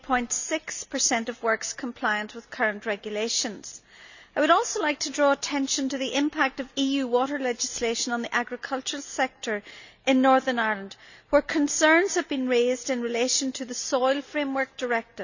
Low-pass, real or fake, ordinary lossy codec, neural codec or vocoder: 7.2 kHz; real; none; none